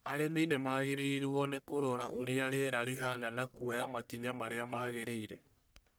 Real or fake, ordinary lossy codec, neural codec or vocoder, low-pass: fake; none; codec, 44.1 kHz, 1.7 kbps, Pupu-Codec; none